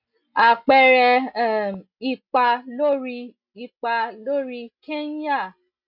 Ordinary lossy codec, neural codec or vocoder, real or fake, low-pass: none; none; real; 5.4 kHz